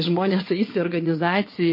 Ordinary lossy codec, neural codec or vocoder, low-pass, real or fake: MP3, 24 kbps; vocoder, 22.05 kHz, 80 mel bands, WaveNeXt; 5.4 kHz; fake